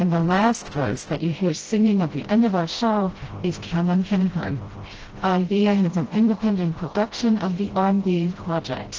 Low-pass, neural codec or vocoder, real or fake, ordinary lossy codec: 7.2 kHz; codec, 16 kHz, 0.5 kbps, FreqCodec, smaller model; fake; Opus, 16 kbps